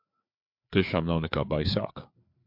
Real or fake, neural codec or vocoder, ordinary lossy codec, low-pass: fake; codec, 16 kHz, 4 kbps, FreqCodec, larger model; MP3, 48 kbps; 5.4 kHz